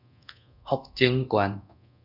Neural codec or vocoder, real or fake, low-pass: codec, 24 kHz, 0.9 kbps, DualCodec; fake; 5.4 kHz